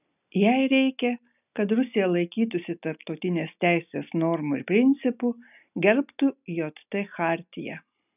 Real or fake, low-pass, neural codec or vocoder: real; 3.6 kHz; none